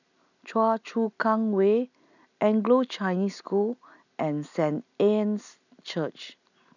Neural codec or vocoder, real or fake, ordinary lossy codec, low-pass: none; real; none; 7.2 kHz